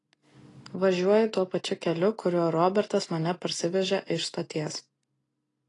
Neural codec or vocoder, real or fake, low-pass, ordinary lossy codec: none; real; 10.8 kHz; AAC, 32 kbps